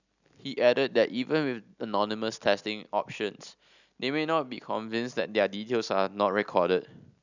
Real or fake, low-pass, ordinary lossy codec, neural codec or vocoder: real; 7.2 kHz; none; none